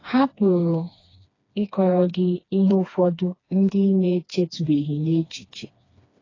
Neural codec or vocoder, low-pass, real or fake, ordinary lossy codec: codec, 16 kHz, 2 kbps, FreqCodec, smaller model; 7.2 kHz; fake; AAC, 32 kbps